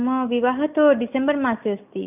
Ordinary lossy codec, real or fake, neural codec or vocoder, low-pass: none; real; none; 3.6 kHz